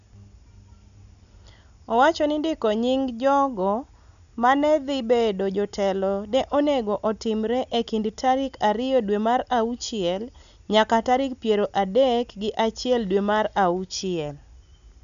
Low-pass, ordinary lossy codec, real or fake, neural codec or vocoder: 7.2 kHz; none; real; none